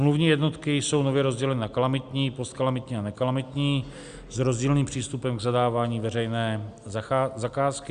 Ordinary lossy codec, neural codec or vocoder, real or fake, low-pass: Opus, 64 kbps; none; real; 9.9 kHz